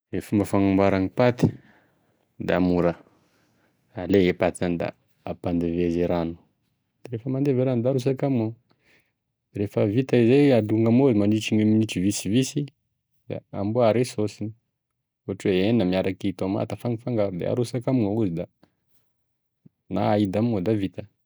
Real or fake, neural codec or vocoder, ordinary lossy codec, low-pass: real; none; none; none